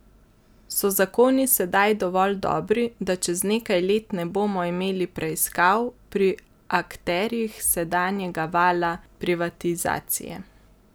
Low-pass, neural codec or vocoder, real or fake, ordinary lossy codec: none; none; real; none